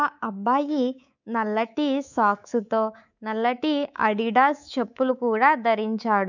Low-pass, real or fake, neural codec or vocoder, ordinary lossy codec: 7.2 kHz; fake; codec, 24 kHz, 3.1 kbps, DualCodec; none